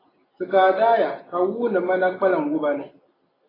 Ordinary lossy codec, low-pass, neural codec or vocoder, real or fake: AAC, 24 kbps; 5.4 kHz; none; real